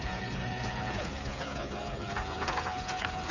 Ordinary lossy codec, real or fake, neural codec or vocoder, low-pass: none; fake; codec, 16 kHz, 8 kbps, FreqCodec, smaller model; 7.2 kHz